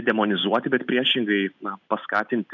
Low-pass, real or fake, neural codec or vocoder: 7.2 kHz; real; none